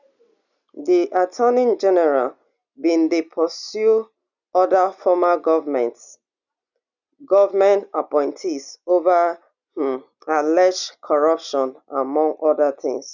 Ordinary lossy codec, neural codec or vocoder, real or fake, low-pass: none; none; real; 7.2 kHz